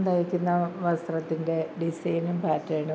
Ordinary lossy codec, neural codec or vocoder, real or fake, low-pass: none; none; real; none